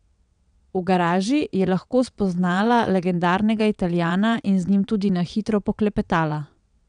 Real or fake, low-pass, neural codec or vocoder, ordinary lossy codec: fake; 9.9 kHz; vocoder, 22.05 kHz, 80 mel bands, WaveNeXt; none